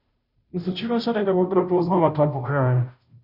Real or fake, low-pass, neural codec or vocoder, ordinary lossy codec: fake; 5.4 kHz; codec, 16 kHz, 0.5 kbps, FunCodec, trained on Chinese and English, 25 frames a second; Opus, 64 kbps